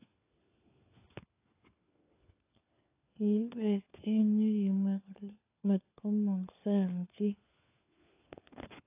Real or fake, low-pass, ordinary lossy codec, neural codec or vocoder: fake; 3.6 kHz; AAC, 24 kbps; codec, 16 kHz, 4 kbps, FunCodec, trained on LibriTTS, 50 frames a second